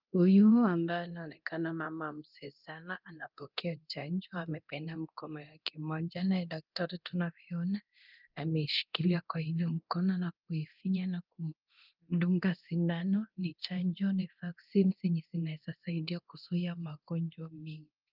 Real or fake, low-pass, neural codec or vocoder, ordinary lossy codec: fake; 5.4 kHz; codec, 24 kHz, 0.9 kbps, DualCodec; Opus, 24 kbps